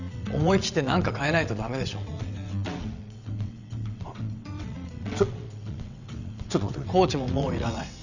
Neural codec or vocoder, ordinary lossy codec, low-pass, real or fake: vocoder, 22.05 kHz, 80 mel bands, WaveNeXt; none; 7.2 kHz; fake